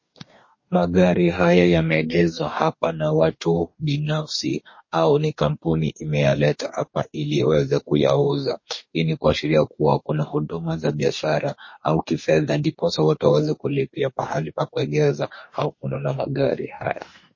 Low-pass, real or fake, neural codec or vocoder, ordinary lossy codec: 7.2 kHz; fake; codec, 44.1 kHz, 2.6 kbps, DAC; MP3, 32 kbps